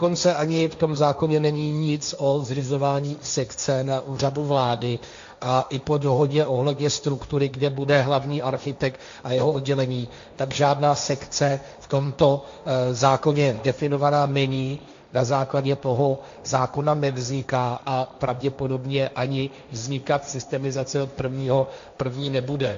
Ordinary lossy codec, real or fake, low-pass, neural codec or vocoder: AAC, 64 kbps; fake; 7.2 kHz; codec, 16 kHz, 1.1 kbps, Voila-Tokenizer